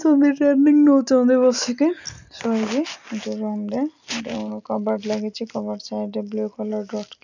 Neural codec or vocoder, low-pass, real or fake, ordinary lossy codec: none; 7.2 kHz; real; none